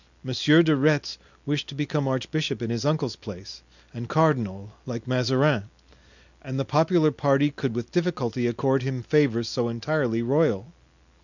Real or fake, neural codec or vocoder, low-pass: real; none; 7.2 kHz